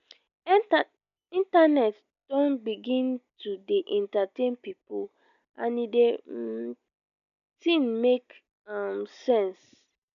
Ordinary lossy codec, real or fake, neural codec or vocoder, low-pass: none; real; none; 7.2 kHz